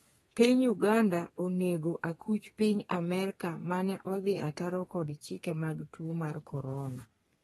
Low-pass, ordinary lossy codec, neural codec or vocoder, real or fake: 14.4 kHz; AAC, 32 kbps; codec, 32 kHz, 1.9 kbps, SNAC; fake